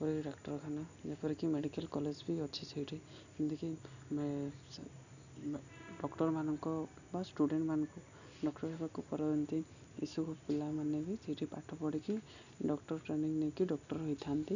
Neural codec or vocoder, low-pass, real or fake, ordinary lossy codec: none; 7.2 kHz; real; none